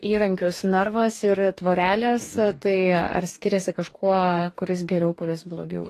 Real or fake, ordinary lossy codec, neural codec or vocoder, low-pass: fake; AAC, 48 kbps; codec, 44.1 kHz, 2.6 kbps, DAC; 14.4 kHz